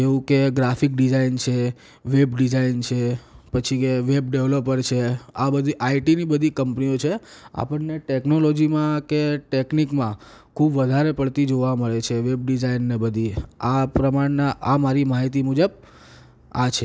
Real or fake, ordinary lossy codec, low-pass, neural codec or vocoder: real; none; none; none